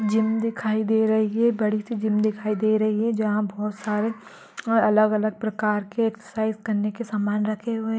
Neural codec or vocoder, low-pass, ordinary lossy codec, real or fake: none; none; none; real